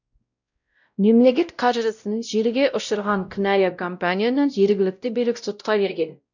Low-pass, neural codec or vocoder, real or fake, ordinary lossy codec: 7.2 kHz; codec, 16 kHz, 0.5 kbps, X-Codec, WavLM features, trained on Multilingual LibriSpeech; fake; none